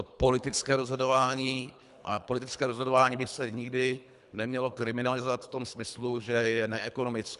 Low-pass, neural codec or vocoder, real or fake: 10.8 kHz; codec, 24 kHz, 3 kbps, HILCodec; fake